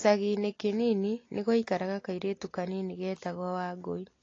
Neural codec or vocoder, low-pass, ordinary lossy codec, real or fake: none; 7.2 kHz; AAC, 32 kbps; real